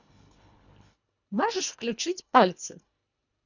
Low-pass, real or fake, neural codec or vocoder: 7.2 kHz; fake; codec, 24 kHz, 1.5 kbps, HILCodec